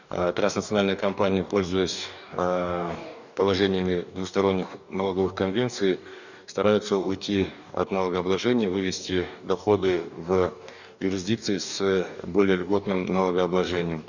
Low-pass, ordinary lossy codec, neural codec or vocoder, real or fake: 7.2 kHz; none; codec, 44.1 kHz, 2.6 kbps, DAC; fake